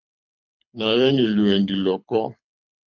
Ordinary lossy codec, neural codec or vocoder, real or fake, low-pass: MP3, 48 kbps; codec, 24 kHz, 6 kbps, HILCodec; fake; 7.2 kHz